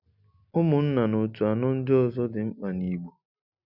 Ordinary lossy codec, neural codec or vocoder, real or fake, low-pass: none; none; real; 5.4 kHz